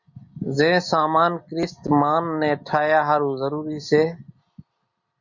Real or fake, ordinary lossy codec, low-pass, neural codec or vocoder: real; Opus, 64 kbps; 7.2 kHz; none